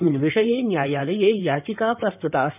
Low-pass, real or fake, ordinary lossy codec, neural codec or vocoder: 3.6 kHz; fake; none; codec, 16 kHz in and 24 kHz out, 2.2 kbps, FireRedTTS-2 codec